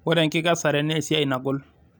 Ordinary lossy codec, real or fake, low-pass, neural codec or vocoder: none; real; none; none